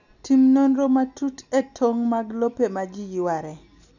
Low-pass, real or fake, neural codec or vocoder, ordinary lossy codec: 7.2 kHz; real; none; none